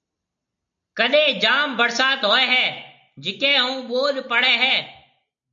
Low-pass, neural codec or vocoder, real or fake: 7.2 kHz; none; real